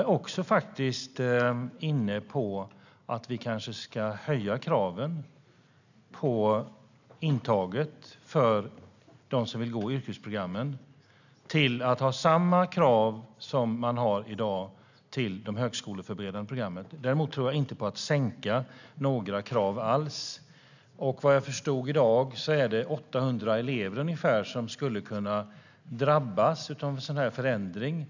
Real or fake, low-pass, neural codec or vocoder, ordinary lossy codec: real; 7.2 kHz; none; none